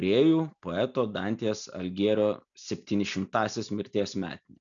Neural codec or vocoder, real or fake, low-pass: none; real; 7.2 kHz